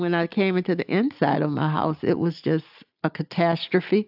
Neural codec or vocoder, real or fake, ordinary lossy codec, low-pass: none; real; AAC, 48 kbps; 5.4 kHz